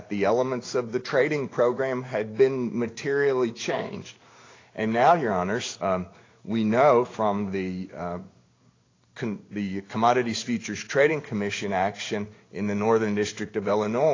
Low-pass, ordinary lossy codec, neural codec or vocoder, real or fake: 7.2 kHz; AAC, 32 kbps; codec, 16 kHz in and 24 kHz out, 1 kbps, XY-Tokenizer; fake